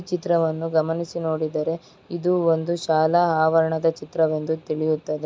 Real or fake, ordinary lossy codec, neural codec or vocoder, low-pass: real; none; none; none